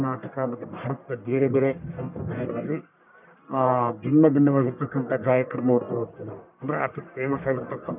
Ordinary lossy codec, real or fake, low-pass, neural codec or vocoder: none; fake; 3.6 kHz; codec, 44.1 kHz, 1.7 kbps, Pupu-Codec